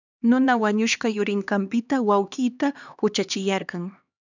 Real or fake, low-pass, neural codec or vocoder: fake; 7.2 kHz; codec, 16 kHz, 2 kbps, X-Codec, HuBERT features, trained on LibriSpeech